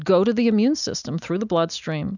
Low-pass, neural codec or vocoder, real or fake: 7.2 kHz; none; real